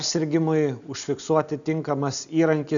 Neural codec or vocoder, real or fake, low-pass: none; real; 7.2 kHz